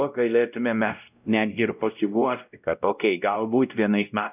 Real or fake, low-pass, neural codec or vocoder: fake; 3.6 kHz; codec, 16 kHz, 0.5 kbps, X-Codec, WavLM features, trained on Multilingual LibriSpeech